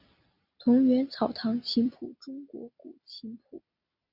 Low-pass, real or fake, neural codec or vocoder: 5.4 kHz; real; none